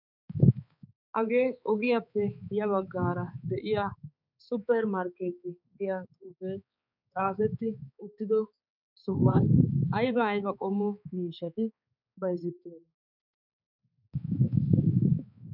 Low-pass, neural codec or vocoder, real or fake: 5.4 kHz; codec, 16 kHz, 4 kbps, X-Codec, HuBERT features, trained on balanced general audio; fake